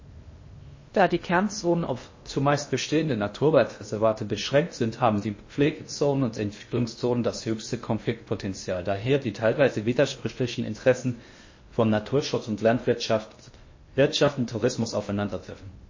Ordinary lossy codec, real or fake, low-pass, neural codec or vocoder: MP3, 32 kbps; fake; 7.2 kHz; codec, 16 kHz in and 24 kHz out, 0.6 kbps, FocalCodec, streaming, 2048 codes